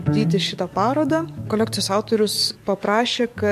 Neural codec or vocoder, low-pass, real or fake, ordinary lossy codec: codec, 44.1 kHz, 7.8 kbps, DAC; 14.4 kHz; fake; MP3, 64 kbps